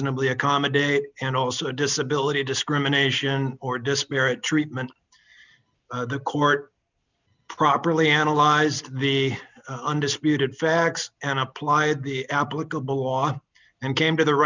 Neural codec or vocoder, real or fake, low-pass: none; real; 7.2 kHz